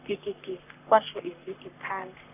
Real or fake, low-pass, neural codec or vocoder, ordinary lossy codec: fake; 3.6 kHz; codec, 44.1 kHz, 3.4 kbps, Pupu-Codec; none